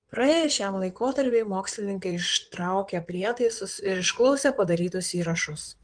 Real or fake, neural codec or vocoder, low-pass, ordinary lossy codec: fake; codec, 16 kHz in and 24 kHz out, 2.2 kbps, FireRedTTS-2 codec; 9.9 kHz; Opus, 24 kbps